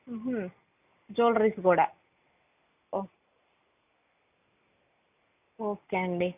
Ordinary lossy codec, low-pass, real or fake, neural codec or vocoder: none; 3.6 kHz; real; none